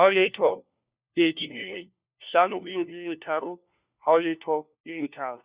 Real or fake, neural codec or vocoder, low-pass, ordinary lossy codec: fake; codec, 16 kHz, 1 kbps, FunCodec, trained on LibriTTS, 50 frames a second; 3.6 kHz; Opus, 64 kbps